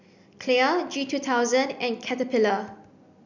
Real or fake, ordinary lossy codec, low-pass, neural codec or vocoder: real; none; 7.2 kHz; none